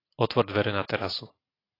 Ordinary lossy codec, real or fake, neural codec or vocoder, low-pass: AAC, 24 kbps; real; none; 5.4 kHz